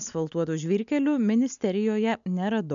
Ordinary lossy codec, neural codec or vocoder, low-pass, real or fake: MP3, 96 kbps; none; 7.2 kHz; real